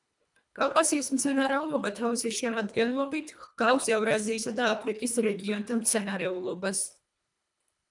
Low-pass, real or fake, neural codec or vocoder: 10.8 kHz; fake; codec, 24 kHz, 1.5 kbps, HILCodec